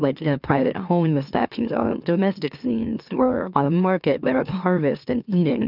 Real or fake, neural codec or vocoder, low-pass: fake; autoencoder, 44.1 kHz, a latent of 192 numbers a frame, MeloTTS; 5.4 kHz